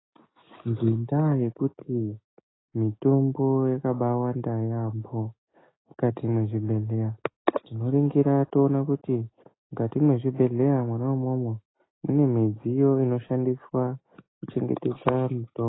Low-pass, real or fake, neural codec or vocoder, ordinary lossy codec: 7.2 kHz; real; none; AAC, 16 kbps